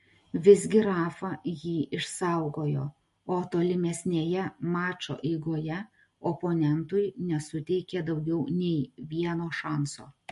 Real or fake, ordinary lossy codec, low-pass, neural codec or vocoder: real; MP3, 48 kbps; 14.4 kHz; none